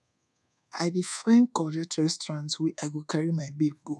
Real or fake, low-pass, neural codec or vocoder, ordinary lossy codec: fake; none; codec, 24 kHz, 1.2 kbps, DualCodec; none